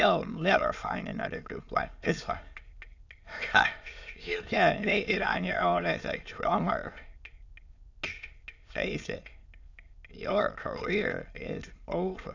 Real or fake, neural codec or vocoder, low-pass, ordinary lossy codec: fake; autoencoder, 22.05 kHz, a latent of 192 numbers a frame, VITS, trained on many speakers; 7.2 kHz; AAC, 48 kbps